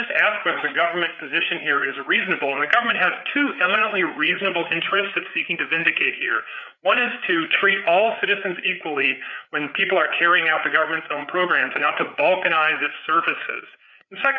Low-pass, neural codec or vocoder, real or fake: 7.2 kHz; codec, 16 kHz, 4 kbps, FreqCodec, larger model; fake